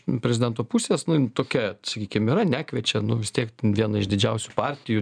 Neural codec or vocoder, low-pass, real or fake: none; 9.9 kHz; real